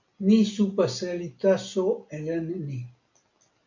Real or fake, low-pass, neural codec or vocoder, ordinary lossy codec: real; 7.2 kHz; none; MP3, 64 kbps